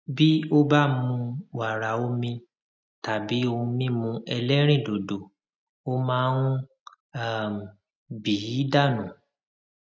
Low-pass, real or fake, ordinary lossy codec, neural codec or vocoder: none; real; none; none